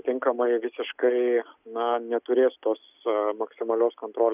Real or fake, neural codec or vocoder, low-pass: real; none; 3.6 kHz